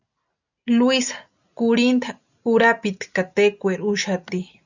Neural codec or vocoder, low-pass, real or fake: none; 7.2 kHz; real